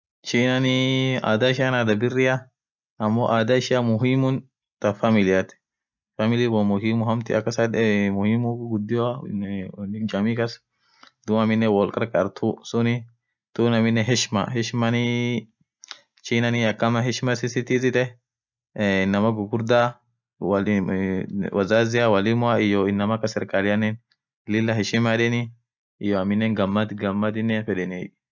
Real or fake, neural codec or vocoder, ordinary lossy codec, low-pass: real; none; none; 7.2 kHz